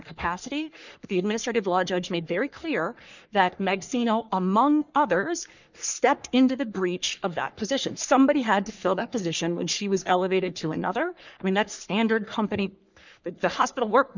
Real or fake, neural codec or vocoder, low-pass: fake; codec, 44.1 kHz, 3.4 kbps, Pupu-Codec; 7.2 kHz